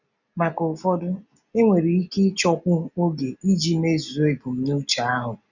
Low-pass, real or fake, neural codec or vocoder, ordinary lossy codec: 7.2 kHz; real; none; none